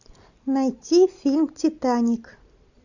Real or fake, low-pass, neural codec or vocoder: real; 7.2 kHz; none